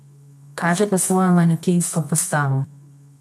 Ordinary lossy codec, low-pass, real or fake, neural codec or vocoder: none; none; fake; codec, 24 kHz, 0.9 kbps, WavTokenizer, medium music audio release